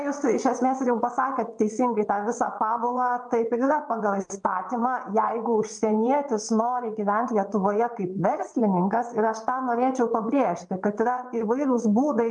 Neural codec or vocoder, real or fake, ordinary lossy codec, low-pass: vocoder, 22.05 kHz, 80 mel bands, WaveNeXt; fake; MP3, 64 kbps; 9.9 kHz